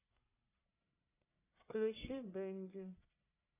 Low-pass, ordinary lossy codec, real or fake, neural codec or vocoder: 3.6 kHz; AAC, 16 kbps; fake; codec, 44.1 kHz, 1.7 kbps, Pupu-Codec